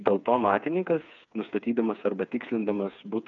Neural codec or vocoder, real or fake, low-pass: codec, 16 kHz, 4 kbps, FreqCodec, smaller model; fake; 7.2 kHz